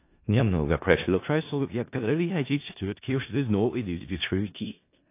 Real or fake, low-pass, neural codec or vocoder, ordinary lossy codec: fake; 3.6 kHz; codec, 16 kHz in and 24 kHz out, 0.4 kbps, LongCat-Audio-Codec, four codebook decoder; AAC, 24 kbps